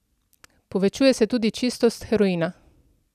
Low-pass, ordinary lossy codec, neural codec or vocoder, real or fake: 14.4 kHz; none; none; real